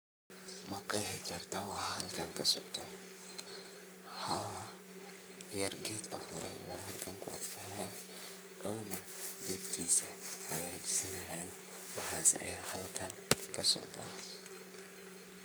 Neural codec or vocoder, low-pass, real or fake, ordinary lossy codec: codec, 44.1 kHz, 3.4 kbps, Pupu-Codec; none; fake; none